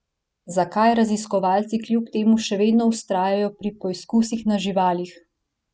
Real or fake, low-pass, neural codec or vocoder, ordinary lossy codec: real; none; none; none